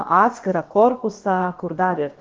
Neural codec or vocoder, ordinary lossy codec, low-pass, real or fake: codec, 16 kHz, about 1 kbps, DyCAST, with the encoder's durations; Opus, 16 kbps; 7.2 kHz; fake